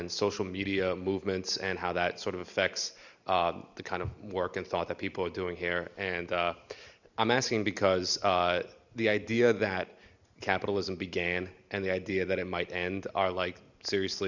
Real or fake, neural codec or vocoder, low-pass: real; none; 7.2 kHz